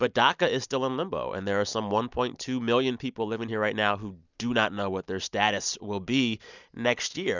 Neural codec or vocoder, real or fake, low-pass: none; real; 7.2 kHz